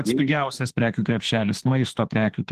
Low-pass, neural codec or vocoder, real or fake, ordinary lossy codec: 14.4 kHz; autoencoder, 48 kHz, 32 numbers a frame, DAC-VAE, trained on Japanese speech; fake; Opus, 16 kbps